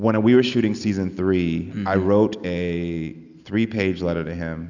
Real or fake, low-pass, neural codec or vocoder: real; 7.2 kHz; none